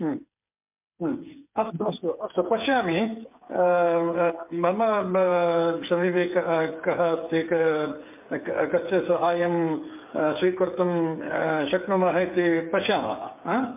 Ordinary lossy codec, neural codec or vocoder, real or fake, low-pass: MP3, 32 kbps; codec, 16 kHz, 8 kbps, FreqCodec, smaller model; fake; 3.6 kHz